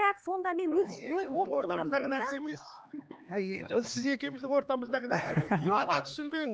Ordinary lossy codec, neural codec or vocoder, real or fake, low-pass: none; codec, 16 kHz, 2 kbps, X-Codec, HuBERT features, trained on LibriSpeech; fake; none